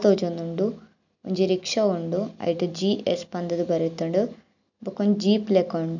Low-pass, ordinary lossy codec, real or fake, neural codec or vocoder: 7.2 kHz; none; real; none